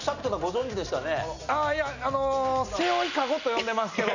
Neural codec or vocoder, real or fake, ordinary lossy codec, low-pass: none; real; none; 7.2 kHz